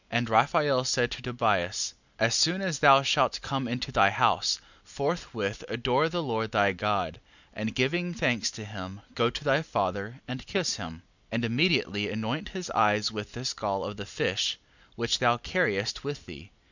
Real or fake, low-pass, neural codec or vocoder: real; 7.2 kHz; none